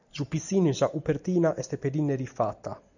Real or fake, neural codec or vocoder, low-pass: real; none; 7.2 kHz